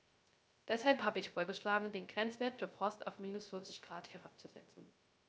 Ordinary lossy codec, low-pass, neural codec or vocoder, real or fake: none; none; codec, 16 kHz, 0.3 kbps, FocalCodec; fake